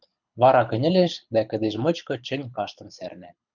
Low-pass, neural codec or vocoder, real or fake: 7.2 kHz; codec, 24 kHz, 6 kbps, HILCodec; fake